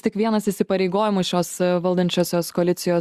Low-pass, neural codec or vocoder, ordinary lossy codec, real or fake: 14.4 kHz; none; Opus, 64 kbps; real